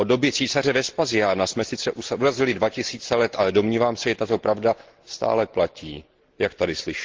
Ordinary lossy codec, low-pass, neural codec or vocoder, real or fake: Opus, 16 kbps; 7.2 kHz; none; real